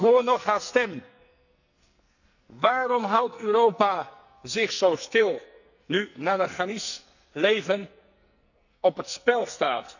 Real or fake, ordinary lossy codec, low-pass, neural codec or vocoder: fake; none; 7.2 kHz; codec, 44.1 kHz, 2.6 kbps, SNAC